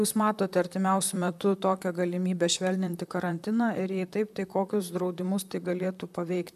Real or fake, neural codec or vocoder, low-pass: fake; vocoder, 44.1 kHz, 128 mel bands, Pupu-Vocoder; 14.4 kHz